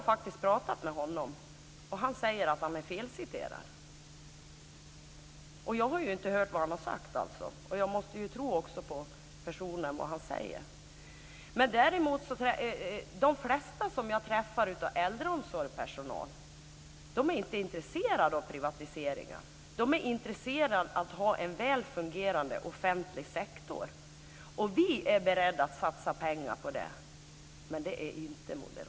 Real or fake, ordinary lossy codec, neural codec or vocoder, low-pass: real; none; none; none